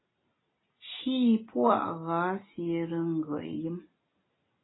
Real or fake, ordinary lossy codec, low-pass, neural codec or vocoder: real; AAC, 16 kbps; 7.2 kHz; none